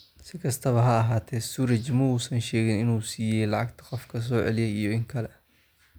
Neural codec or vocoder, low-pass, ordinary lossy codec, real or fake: none; none; none; real